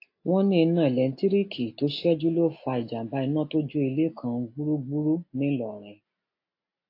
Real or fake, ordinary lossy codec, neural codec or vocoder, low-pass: real; AAC, 32 kbps; none; 5.4 kHz